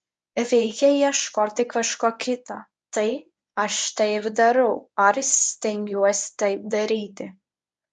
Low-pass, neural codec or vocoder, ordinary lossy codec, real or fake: 10.8 kHz; codec, 24 kHz, 0.9 kbps, WavTokenizer, medium speech release version 1; MP3, 96 kbps; fake